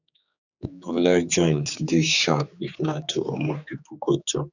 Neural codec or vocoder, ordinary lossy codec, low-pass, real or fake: codec, 16 kHz, 4 kbps, X-Codec, HuBERT features, trained on general audio; none; 7.2 kHz; fake